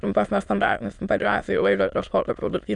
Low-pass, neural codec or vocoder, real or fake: 9.9 kHz; autoencoder, 22.05 kHz, a latent of 192 numbers a frame, VITS, trained on many speakers; fake